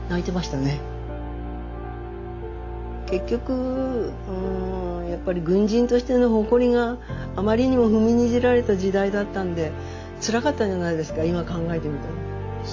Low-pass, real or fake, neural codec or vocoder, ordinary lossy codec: 7.2 kHz; real; none; AAC, 48 kbps